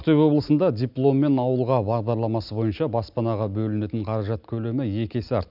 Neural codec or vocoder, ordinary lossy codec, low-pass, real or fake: none; none; 5.4 kHz; real